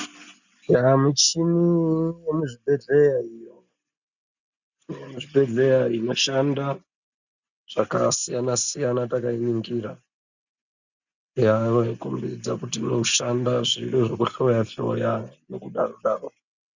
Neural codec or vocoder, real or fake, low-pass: none; real; 7.2 kHz